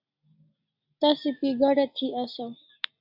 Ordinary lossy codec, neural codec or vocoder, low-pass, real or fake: AAC, 48 kbps; none; 5.4 kHz; real